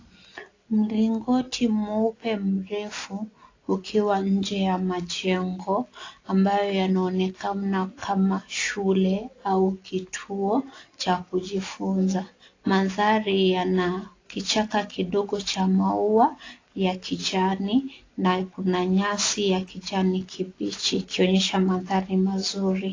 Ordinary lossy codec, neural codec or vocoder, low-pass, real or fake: AAC, 32 kbps; none; 7.2 kHz; real